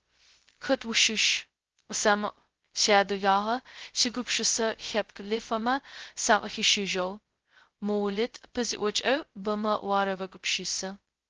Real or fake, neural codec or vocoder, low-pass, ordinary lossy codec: fake; codec, 16 kHz, 0.2 kbps, FocalCodec; 7.2 kHz; Opus, 16 kbps